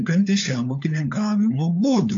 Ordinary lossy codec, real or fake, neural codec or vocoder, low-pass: AAC, 64 kbps; fake; codec, 16 kHz, 4 kbps, FunCodec, trained on LibriTTS, 50 frames a second; 7.2 kHz